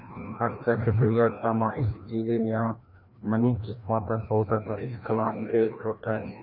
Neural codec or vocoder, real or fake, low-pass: codec, 16 kHz, 1 kbps, FreqCodec, larger model; fake; 5.4 kHz